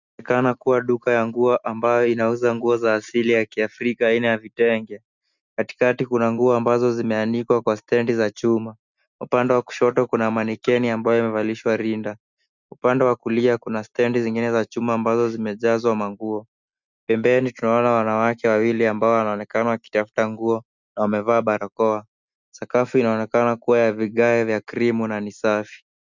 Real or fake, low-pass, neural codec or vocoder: real; 7.2 kHz; none